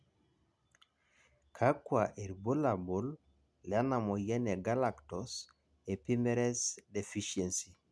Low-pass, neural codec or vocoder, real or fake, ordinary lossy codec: none; none; real; none